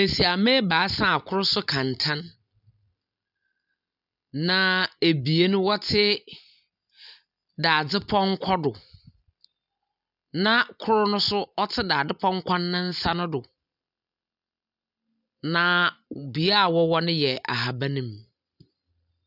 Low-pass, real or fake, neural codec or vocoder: 5.4 kHz; real; none